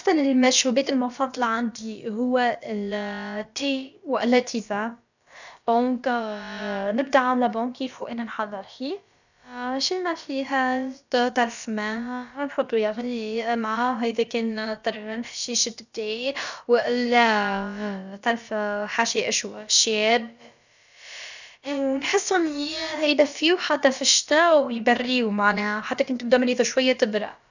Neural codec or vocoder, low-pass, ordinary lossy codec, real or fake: codec, 16 kHz, about 1 kbps, DyCAST, with the encoder's durations; 7.2 kHz; none; fake